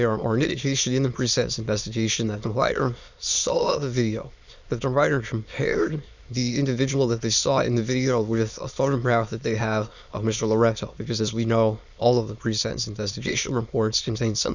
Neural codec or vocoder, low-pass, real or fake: autoencoder, 22.05 kHz, a latent of 192 numbers a frame, VITS, trained on many speakers; 7.2 kHz; fake